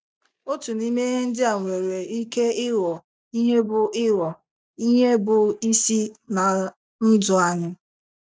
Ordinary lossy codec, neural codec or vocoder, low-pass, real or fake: none; none; none; real